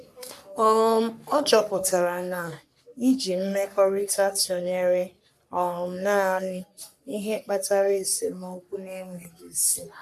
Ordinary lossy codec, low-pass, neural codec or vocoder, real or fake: none; 14.4 kHz; codec, 44.1 kHz, 3.4 kbps, Pupu-Codec; fake